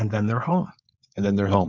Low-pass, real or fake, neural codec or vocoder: 7.2 kHz; fake; codec, 16 kHz, 4.8 kbps, FACodec